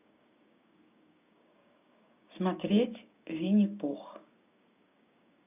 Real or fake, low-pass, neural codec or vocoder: fake; 3.6 kHz; codec, 16 kHz, 6 kbps, DAC